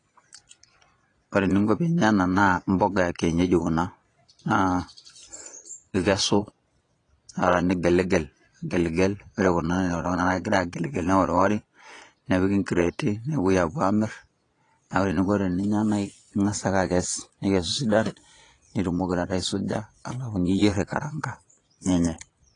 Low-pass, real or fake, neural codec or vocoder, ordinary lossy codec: 9.9 kHz; fake; vocoder, 22.05 kHz, 80 mel bands, Vocos; AAC, 32 kbps